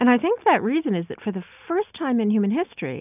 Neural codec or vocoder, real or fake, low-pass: none; real; 3.6 kHz